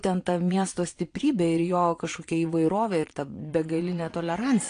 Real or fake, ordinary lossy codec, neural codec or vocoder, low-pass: fake; AAC, 48 kbps; vocoder, 22.05 kHz, 80 mel bands, Vocos; 9.9 kHz